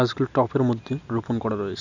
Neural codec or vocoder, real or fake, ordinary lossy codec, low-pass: none; real; none; 7.2 kHz